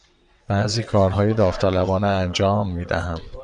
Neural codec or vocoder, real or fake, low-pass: vocoder, 22.05 kHz, 80 mel bands, WaveNeXt; fake; 9.9 kHz